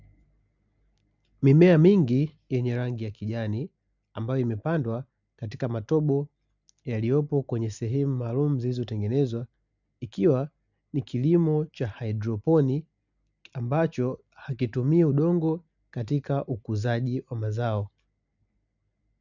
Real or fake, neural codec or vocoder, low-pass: real; none; 7.2 kHz